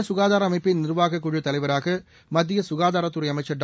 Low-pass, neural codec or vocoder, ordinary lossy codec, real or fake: none; none; none; real